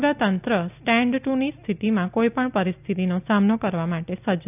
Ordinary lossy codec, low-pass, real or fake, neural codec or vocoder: none; 3.6 kHz; real; none